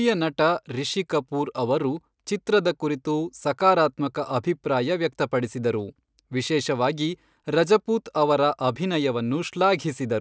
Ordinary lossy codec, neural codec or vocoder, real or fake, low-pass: none; none; real; none